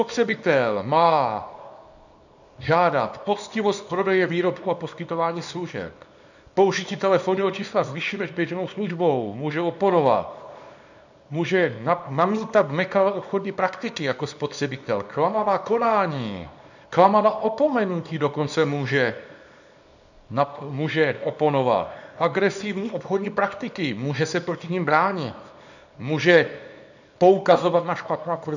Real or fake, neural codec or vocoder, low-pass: fake; codec, 24 kHz, 0.9 kbps, WavTokenizer, small release; 7.2 kHz